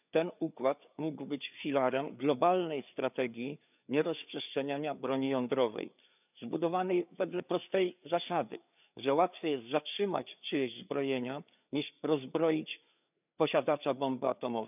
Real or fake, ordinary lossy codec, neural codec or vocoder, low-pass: fake; none; codec, 16 kHz, 4 kbps, FreqCodec, larger model; 3.6 kHz